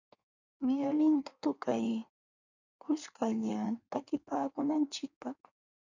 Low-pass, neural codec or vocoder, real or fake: 7.2 kHz; codec, 16 kHz in and 24 kHz out, 1.1 kbps, FireRedTTS-2 codec; fake